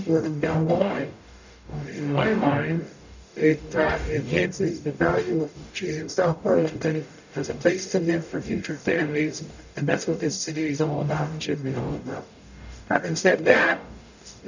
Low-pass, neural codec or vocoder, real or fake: 7.2 kHz; codec, 44.1 kHz, 0.9 kbps, DAC; fake